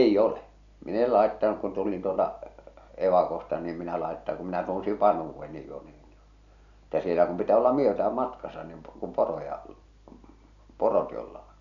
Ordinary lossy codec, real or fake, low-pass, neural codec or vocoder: none; real; 7.2 kHz; none